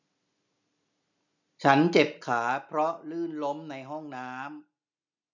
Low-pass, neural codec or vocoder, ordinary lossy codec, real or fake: 7.2 kHz; none; none; real